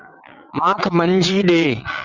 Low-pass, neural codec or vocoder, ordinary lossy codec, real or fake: 7.2 kHz; vocoder, 22.05 kHz, 80 mel bands, WaveNeXt; Opus, 64 kbps; fake